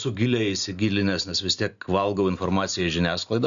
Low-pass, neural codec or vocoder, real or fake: 7.2 kHz; none; real